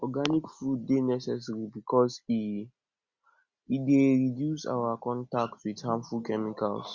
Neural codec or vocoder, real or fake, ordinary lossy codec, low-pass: none; real; Opus, 64 kbps; 7.2 kHz